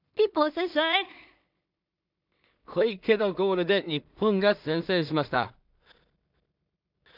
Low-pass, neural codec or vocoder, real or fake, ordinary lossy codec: 5.4 kHz; codec, 16 kHz in and 24 kHz out, 0.4 kbps, LongCat-Audio-Codec, two codebook decoder; fake; none